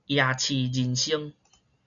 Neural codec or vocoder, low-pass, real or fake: none; 7.2 kHz; real